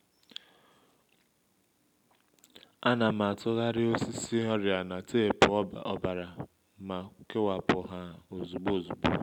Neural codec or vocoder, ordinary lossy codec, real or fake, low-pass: none; none; real; 19.8 kHz